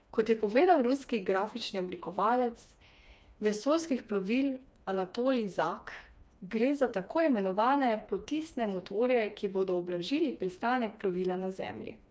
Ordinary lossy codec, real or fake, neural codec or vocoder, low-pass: none; fake; codec, 16 kHz, 2 kbps, FreqCodec, smaller model; none